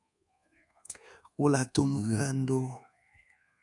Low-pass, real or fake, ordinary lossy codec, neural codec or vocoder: 10.8 kHz; fake; MP3, 96 kbps; codec, 24 kHz, 1.2 kbps, DualCodec